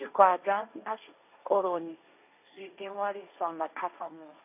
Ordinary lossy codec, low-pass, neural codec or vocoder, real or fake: none; 3.6 kHz; codec, 16 kHz, 1.1 kbps, Voila-Tokenizer; fake